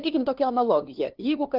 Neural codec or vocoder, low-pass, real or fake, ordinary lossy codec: codec, 16 kHz, 2 kbps, FunCodec, trained on LibriTTS, 25 frames a second; 5.4 kHz; fake; Opus, 16 kbps